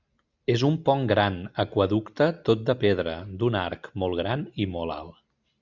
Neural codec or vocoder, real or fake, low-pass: none; real; 7.2 kHz